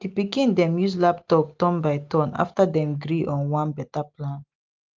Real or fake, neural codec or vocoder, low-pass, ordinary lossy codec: real; none; 7.2 kHz; Opus, 24 kbps